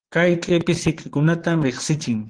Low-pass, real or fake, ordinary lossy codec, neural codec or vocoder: 9.9 kHz; fake; Opus, 16 kbps; vocoder, 22.05 kHz, 80 mel bands, Vocos